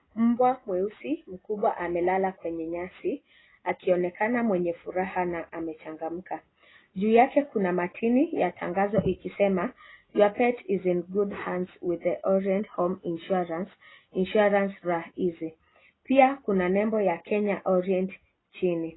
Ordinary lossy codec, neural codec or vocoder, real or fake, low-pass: AAC, 16 kbps; none; real; 7.2 kHz